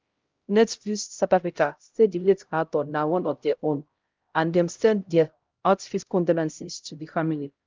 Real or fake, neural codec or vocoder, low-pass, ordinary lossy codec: fake; codec, 16 kHz, 0.5 kbps, X-Codec, HuBERT features, trained on LibriSpeech; 7.2 kHz; Opus, 32 kbps